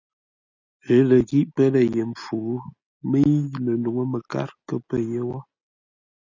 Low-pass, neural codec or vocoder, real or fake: 7.2 kHz; none; real